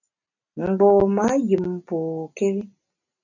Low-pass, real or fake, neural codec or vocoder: 7.2 kHz; real; none